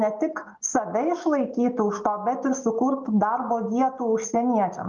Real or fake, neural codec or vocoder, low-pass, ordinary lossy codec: real; none; 7.2 kHz; Opus, 32 kbps